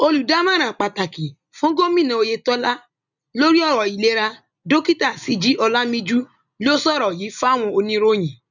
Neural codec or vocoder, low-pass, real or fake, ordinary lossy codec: none; 7.2 kHz; real; none